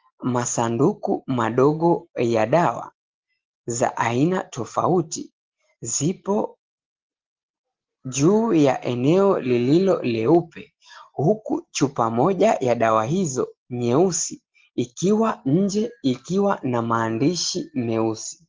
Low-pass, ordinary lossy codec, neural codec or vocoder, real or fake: 7.2 kHz; Opus, 16 kbps; none; real